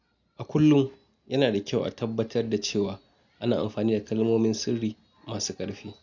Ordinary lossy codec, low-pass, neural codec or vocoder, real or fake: none; 7.2 kHz; none; real